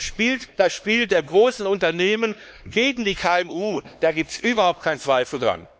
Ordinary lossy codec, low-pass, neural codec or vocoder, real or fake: none; none; codec, 16 kHz, 2 kbps, X-Codec, HuBERT features, trained on LibriSpeech; fake